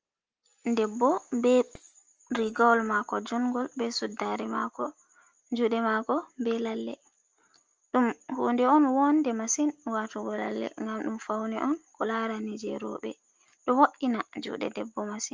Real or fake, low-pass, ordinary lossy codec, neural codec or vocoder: real; 7.2 kHz; Opus, 24 kbps; none